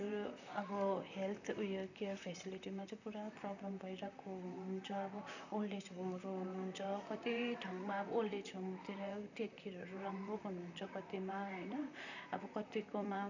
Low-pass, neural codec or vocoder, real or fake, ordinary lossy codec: 7.2 kHz; vocoder, 44.1 kHz, 128 mel bands, Pupu-Vocoder; fake; none